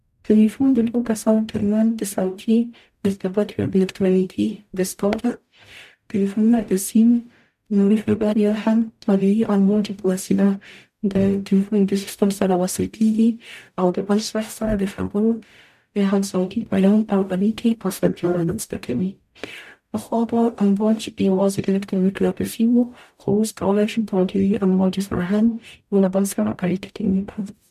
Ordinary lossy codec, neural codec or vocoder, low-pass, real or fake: MP3, 96 kbps; codec, 44.1 kHz, 0.9 kbps, DAC; 14.4 kHz; fake